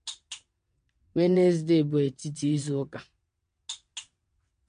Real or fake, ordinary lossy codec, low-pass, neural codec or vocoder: fake; MP3, 48 kbps; 9.9 kHz; vocoder, 22.05 kHz, 80 mel bands, WaveNeXt